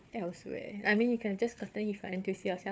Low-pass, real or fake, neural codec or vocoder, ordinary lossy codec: none; fake; codec, 16 kHz, 4 kbps, FunCodec, trained on Chinese and English, 50 frames a second; none